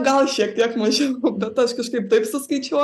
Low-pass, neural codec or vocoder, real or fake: 14.4 kHz; none; real